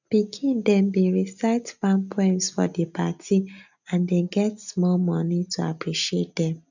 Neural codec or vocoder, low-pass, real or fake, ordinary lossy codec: none; 7.2 kHz; real; none